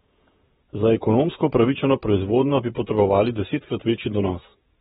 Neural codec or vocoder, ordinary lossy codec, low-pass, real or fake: vocoder, 48 kHz, 128 mel bands, Vocos; AAC, 16 kbps; 19.8 kHz; fake